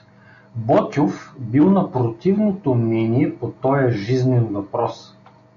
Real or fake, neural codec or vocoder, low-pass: real; none; 7.2 kHz